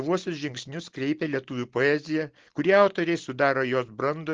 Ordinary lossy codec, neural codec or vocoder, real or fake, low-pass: Opus, 16 kbps; none; real; 7.2 kHz